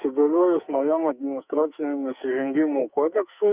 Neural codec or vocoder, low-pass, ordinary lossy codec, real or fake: codec, 44.1 kHz, 2.6 kbps, SNAC; 3.6 kHz; Opus, 64 kbps; fake